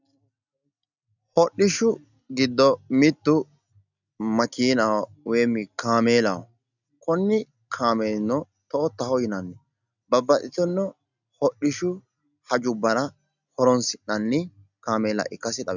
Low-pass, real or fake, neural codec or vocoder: 7.2 kHz; real; none